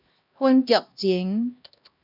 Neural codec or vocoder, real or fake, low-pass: codec, 16 kHz, 1 kbps, X-Codec, HuBERT features, trained on LibriSpeech; fake; 5.4 kHz